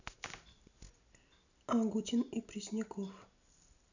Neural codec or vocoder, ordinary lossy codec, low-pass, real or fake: none; none; 7.2 kHz; real